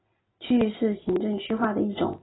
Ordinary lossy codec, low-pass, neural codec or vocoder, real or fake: AAC, 16 kbps; 7.2 kHz; none; real